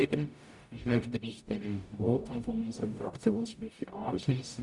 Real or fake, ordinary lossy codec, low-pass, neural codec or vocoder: fake; none; 10.8 kHz; codec, 44.1 kHz, 0.9 kbps, DAC